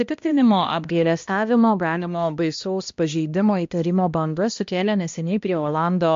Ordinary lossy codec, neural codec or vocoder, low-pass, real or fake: MP3, 48 kbps; codec, 16 kHz, 1 kbps, X-Codec, HuBERT features, trained on balanced general audio; 7.2 kHz; fake